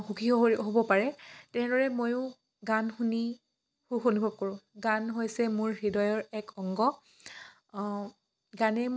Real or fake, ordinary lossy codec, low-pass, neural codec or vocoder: real; none; none; none